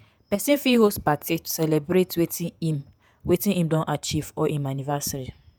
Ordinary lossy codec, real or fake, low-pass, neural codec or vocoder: none; fake; none; vocoder, 48 kHz, 128 mel bands, Vocos